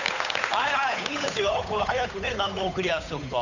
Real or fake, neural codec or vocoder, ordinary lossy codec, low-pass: fake; codec, 16 kHz, 8 kbps, FunCodec, trained on Chinese and English, 25 frames a second; none; 7.2 kHz